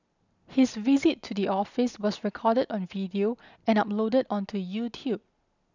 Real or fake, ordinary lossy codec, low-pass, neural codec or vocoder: real; none; 7.2 kHz; none